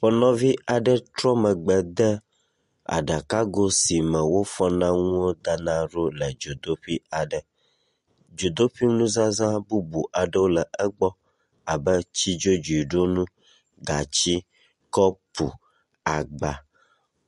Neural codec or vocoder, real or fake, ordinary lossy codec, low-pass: none; real; MP3, 48 kbps; 14.4 kHz